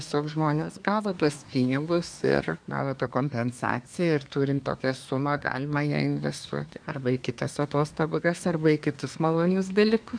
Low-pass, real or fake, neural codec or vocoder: 9.9 kHz; fake; codec, 24 kHz, 1 kbps, SNAC